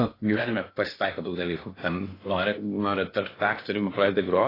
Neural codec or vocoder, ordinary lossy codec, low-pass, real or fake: codec, 16 kHz in and 24 kHz out, 0.8 kbps, FocalCodec, streaming, 65536 codes; AAC, 24 kbps; 5.4 kHz; fake